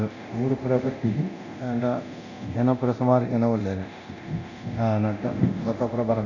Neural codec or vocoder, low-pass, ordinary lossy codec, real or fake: codec, 24 kHz, 0.9 kbps, DualCodec; 7.2 kHz; none; fake